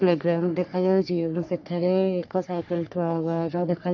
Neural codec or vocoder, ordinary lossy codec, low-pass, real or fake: codec, 44.1 kHz, 3.4 kbps, Pupu-Codec; none; 7.2 kHz; fake